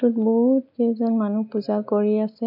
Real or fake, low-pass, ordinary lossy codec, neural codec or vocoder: real; 5.4 kHz; none; none